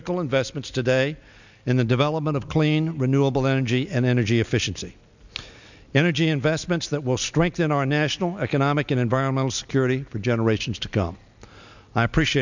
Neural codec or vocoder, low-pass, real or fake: none; 7.2 kHz; real